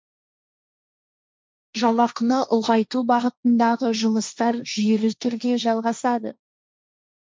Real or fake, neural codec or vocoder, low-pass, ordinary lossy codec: fake; codec, 16 kHz, 1.1 kbps, Voila-Tokenizer; none; none